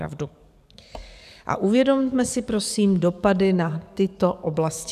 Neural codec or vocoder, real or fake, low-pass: codec, 44.1 kHz, 7.8 kbps, DAC; fake; 14.4 kHz